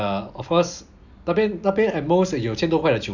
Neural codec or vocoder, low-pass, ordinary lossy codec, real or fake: none; 7.2 kHz; none; real